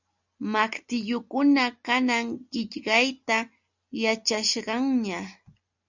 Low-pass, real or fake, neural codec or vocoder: 7.2 kHz; real; none